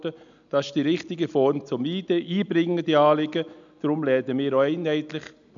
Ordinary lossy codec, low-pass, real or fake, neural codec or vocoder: none; 7.2 kHz; real; none